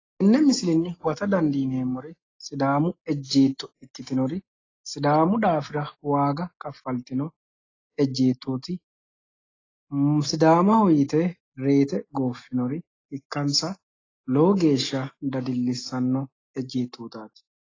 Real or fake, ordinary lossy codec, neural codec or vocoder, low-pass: real; AAC, 32 kbps; none; 7.2 kHz